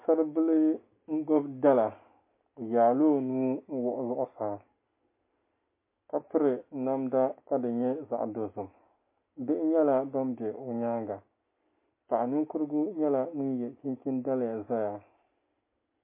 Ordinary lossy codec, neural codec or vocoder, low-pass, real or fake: MP3, 24 kbps; none; 3.6 kHz; real